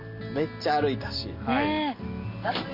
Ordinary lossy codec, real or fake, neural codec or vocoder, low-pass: none; real; none; 5.4 kHz